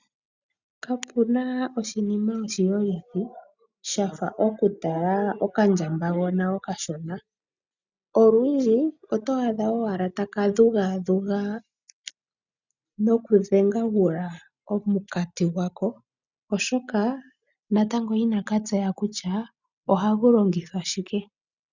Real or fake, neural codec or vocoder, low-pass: real; none; 7.2 kHz